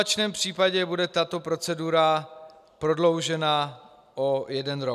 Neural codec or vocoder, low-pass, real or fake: none; 14.4 kHz; real